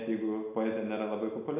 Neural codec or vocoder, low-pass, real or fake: none; 3.6 kHz; real